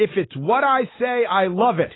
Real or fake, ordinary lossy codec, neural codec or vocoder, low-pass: real; AAC, 16 kbps; none; 7.2 kHz